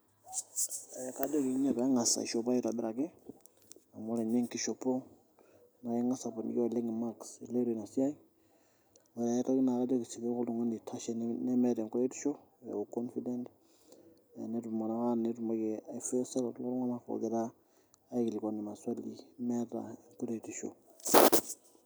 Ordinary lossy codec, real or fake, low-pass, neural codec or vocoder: none; real; none; none